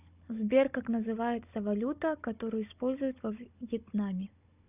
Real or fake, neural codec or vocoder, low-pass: fake; codec, 16 kHz, 16 kbps, FunCodec, trained on Chinese and English, 50 frames a second; 3.6 kHz